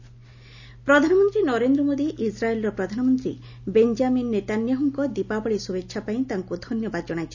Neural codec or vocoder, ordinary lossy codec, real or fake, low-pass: none; none; real; 7.2 kHz